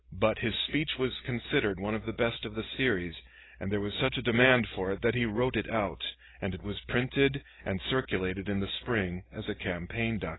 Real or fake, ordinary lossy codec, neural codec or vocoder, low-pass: real; AAC, 16 kbps; none; 7.2 kHz